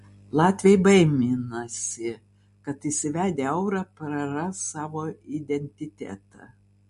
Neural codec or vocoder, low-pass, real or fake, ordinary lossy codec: none; 14.4 kHz; real; MP3, 48 kbps